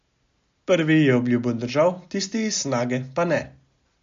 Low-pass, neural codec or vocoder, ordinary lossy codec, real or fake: 7.2 kHz; none; MP3, 48 kbps; real